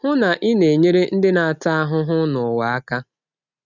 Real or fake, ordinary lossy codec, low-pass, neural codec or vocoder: real; none; 7.2 kHz; none